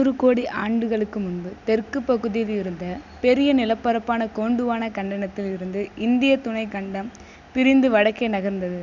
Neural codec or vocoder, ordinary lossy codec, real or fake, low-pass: none; none; real; 7.2 kHz